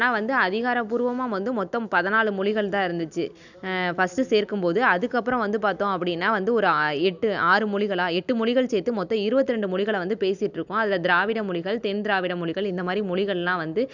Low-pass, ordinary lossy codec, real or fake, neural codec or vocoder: 7.2 kHz; none; real; none